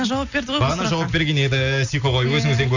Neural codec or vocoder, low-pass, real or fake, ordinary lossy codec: none; 7.2 kHz; real; none